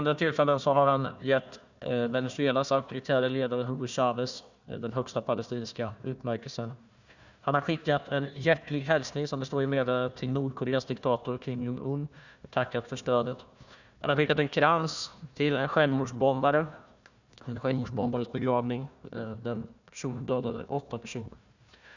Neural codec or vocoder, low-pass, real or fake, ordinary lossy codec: codec, 16 kHz, 1 kbps, FunCodec, trained on Chinese and English, 50 frames a second; 7.2 kHz; fake; none